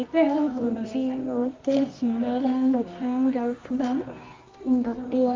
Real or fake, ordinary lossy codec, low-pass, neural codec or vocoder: fake; Opus, 32 kbps; 7.2 kHz; codec, 24 kHz, 0.9 kbps, WavTokenizer, medium music audio release